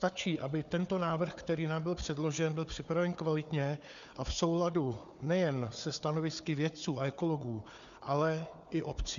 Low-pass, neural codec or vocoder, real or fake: 7.2 kHz; codec, 16 kHz, 4 kbps, FunCodec, trained on Chinese and English, 50 frames a second; fake